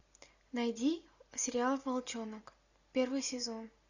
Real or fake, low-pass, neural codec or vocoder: real; 7.2 kHz; none